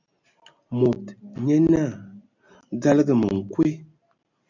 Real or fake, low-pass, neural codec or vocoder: real; 7.2 kHz; none